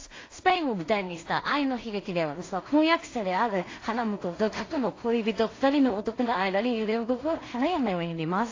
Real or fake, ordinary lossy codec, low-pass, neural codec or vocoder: fake; AAC, 32 kbps; 7.2 kHz; codec, 16 kHz in and 24 kHz out, 0.4 kbps, LongCat-Audio-Codec, two codebook decoder